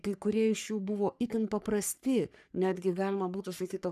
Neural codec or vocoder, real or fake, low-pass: codec, 44.1 kHz, 3.4 kbps, Pupu-Codec; fake; 14.4 kHz